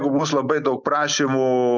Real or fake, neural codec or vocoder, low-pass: real; none; 7.2 kHz